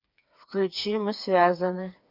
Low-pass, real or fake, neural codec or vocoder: 5.4 kHz; fake; codec, 16 kHz, 4 kbps, FreqCodec, smaller model